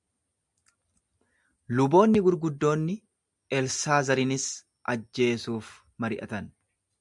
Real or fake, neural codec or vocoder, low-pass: real; none; 10.8 kHz